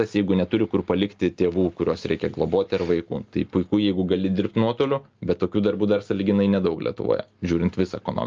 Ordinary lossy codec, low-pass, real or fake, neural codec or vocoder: Opus, 24 kbps; 7.2 kHz; real; none